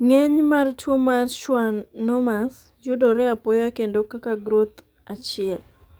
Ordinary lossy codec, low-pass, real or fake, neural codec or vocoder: none; none; fake; codec, 44.1 kHz, 7.8 kbps, DAC